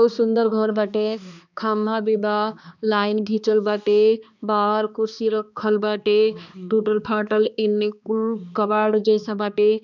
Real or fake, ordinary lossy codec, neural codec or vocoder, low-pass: fake; none; codec, 16 kHz, 2 kbps, X-Codec, HuBERT features, trained on balanced general audio; 7.2 kHz